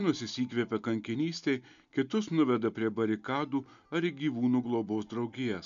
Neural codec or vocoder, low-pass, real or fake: none; 7.2 kHz; real